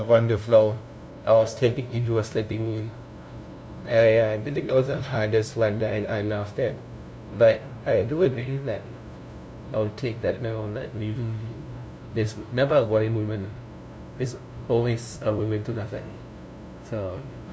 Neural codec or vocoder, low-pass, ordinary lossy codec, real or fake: codec, 16 kHz, 0.5 kbps, FunCodec, trained on LibriTTS, 25 frames a second; none; none; fake